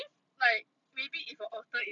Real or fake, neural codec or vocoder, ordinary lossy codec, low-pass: real; none; none; 7.2 kHz